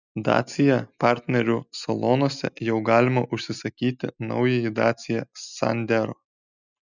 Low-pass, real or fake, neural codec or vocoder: 7.2 kHz; real; none